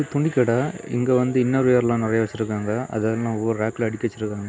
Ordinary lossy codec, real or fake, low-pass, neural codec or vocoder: none; real; none; none